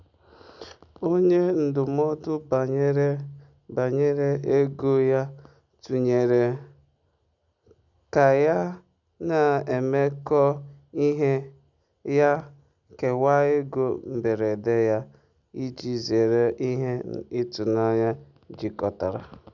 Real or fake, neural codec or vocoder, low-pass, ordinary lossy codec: real; none; 7.2 kHz; none